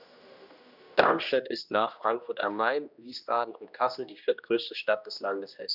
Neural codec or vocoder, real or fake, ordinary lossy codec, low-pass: codec, 16 kHz, 1 kbps, X-Codec, HuBERT features, trained on general audio; fake; none; 5.4 kHz